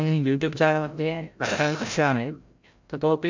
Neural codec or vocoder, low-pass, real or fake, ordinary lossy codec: codec, 16 kHz, 0.5 kbps, FreqCodec, larger model; 7.2 kHz; fake; MP3, 64 kbps